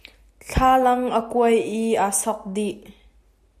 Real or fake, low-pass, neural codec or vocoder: real; 14.4 kHz; none